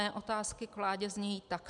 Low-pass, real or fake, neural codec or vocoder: 10.8 kHz; real; none